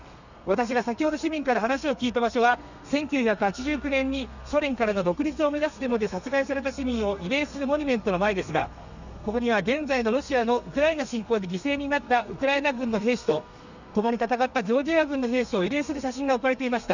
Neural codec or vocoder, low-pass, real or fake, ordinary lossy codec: codec, 32 kHz, 1.9 kbps, SNAC; 7.2 kHz; fake; none